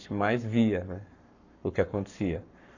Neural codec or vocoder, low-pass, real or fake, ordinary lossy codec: codec, 44.1 kHz, 7.8 kbps, Pupu-Codec; 7.2 kHz; fake; none